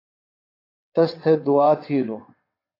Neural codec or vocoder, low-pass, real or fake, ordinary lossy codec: vocoder, 22.05 kHz, 80 mel bands, WaveNeXt; 5.4 kHz; fake; AAC, 32 kbps